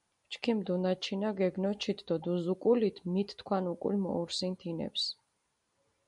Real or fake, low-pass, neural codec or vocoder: real; 10.8 kHz; none